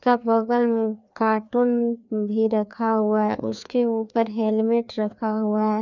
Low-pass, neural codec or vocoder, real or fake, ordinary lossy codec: 7.2 kHz; codec, 16 kHz, 2 kbps, FreqCodec, larger model; fake; none